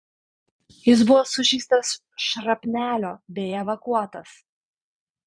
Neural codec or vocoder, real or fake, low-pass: vocoder, 22.05 kHz, 80 mel bands, Vocos; fake; 9.9 kHz